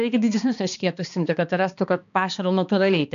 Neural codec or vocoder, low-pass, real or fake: codec, 16 kHz, 2 kbps, X-Codec, HuBERT features, trained on balanced general audio; 7.2 kHz; fake